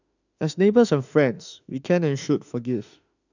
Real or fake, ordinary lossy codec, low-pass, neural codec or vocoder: fake; none; 7.2 kHz; autoencoder, 48 kHz, 32 numbers a frame, DAC-VAE, trained on Japanese speech